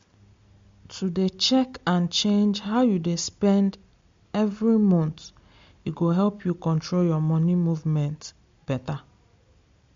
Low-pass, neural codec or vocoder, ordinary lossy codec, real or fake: 7.2 kHz; none; MP3, 48 kbps; real